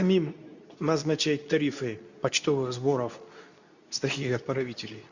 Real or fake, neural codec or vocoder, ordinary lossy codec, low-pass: fake; codec, 24 kHz, 0.9 kbps, WavTokenizer, medium speech release version 2; none; 7.2 kHz